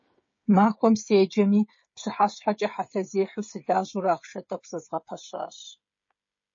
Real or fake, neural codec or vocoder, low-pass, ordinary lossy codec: fake; codec, 16 kHz, 16 kbps, FreqCodec, smaller model; 7.2 kHz; MP3, 32 kbps